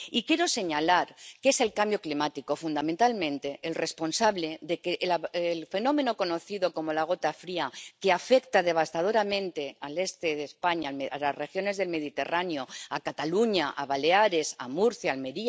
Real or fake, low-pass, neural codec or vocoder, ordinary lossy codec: real; none; none; none